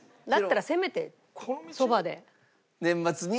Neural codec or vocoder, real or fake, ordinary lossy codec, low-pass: none; real; none; none